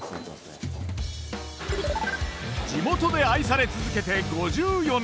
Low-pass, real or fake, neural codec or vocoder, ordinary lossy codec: none; real; none; none